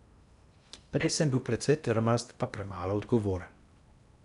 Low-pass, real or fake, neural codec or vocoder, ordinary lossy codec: 10.8 kHz; fake; codec, 16 kHz in and 24 kHz out, 0.8 kbps, FocalCodec, streaming, 65536 codes; none